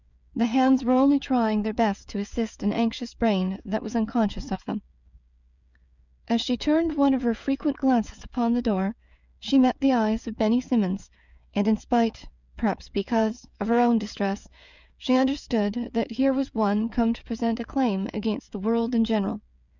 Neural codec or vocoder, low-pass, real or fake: codec, 16 kHz, 16 kbps, FreqCodec, smaller model; 7.2 kHz; fake